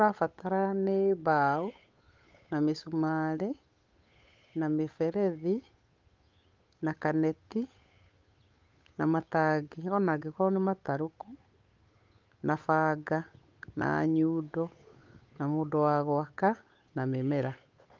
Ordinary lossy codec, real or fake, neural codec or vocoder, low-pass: Opus, 24 kbps; fake; codec, 16 kHz, 8 kbps, FunCodec, trained on Chinese and English, 25 frames a second; 7.2 kHz